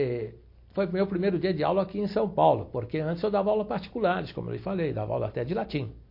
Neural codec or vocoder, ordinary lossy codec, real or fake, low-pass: none; MP3, 32 kbps; real; 5.4 kHz